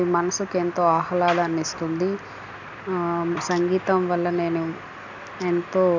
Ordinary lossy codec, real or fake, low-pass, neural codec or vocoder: none; real; 7.2 kHz; none